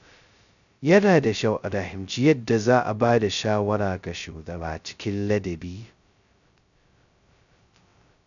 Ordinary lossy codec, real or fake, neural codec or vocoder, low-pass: none; fake; codec, 16 kHz, 0.2 kbps, FocalCodec; 7.2 kHz